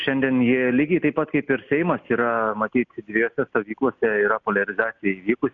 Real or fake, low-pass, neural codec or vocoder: real; 7.2 kHz; none